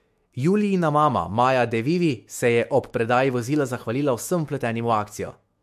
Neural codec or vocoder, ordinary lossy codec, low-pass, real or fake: autoencoder, 48 kHz, 128 numbers a frame, DAC-VAE, trained on Japanese speech; MP3, 64 kbps; 14.4 kHz; fake